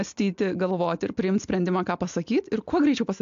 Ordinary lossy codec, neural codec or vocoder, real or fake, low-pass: AAC, 64 kbps; none; real; 7.2 kHz